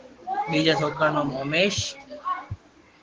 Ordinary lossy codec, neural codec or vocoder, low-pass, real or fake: Opus, 16 kbps; codec, 16 kHz, 6 kbps, DAC; 7.2 kHz; fake